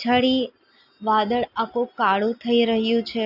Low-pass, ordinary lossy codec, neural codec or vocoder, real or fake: 5.4 kHz; AAC, 48 kbps; none; real